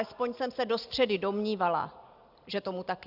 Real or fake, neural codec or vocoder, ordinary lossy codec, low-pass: real; none; Opus, 64 kbps; 5.4 kHz